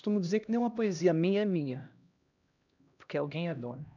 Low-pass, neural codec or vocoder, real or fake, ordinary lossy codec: 7.2 kHz; codec, 16 kHz, 1 kbps, X-Codec, HuBERT features, trained on LibriSpeech; fake; none